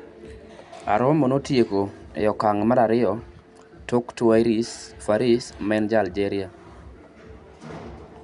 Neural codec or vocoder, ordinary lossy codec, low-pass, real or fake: none; none; 10.8 kHz; real